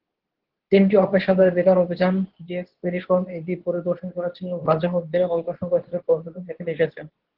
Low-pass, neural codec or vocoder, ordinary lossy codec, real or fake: 5.4 kHz; codec, 24 kHz, 0.9 kbps, WavTokenizer, medium speech release version 2; Opus, 16 kbps; fake